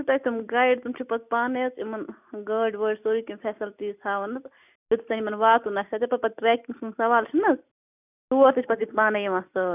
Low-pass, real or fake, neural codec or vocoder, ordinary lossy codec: 3.6 kHz; real; none; none